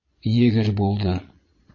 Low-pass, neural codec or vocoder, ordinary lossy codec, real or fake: 7.2 kHz; vocoder, 22.05 kHz, 80 mel bands, Vocos; MP3, 32 kbps; fake